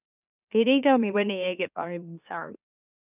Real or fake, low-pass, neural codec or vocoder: fake; 3.6 kHz; autoencoder, 44.1 kHz, a latent of 192 numbers a frame, MeloTTS